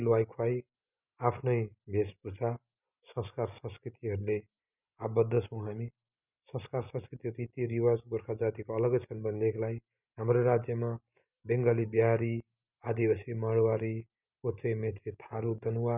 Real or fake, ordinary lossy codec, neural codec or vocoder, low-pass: real; none; none; 3.6 kHz